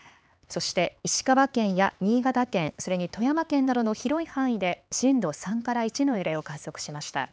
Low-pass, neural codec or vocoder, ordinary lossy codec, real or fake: none; codec, 16 kHz, 4 kbps, X-Codec, HuBERT features, trained on LibriSpeech; none; fake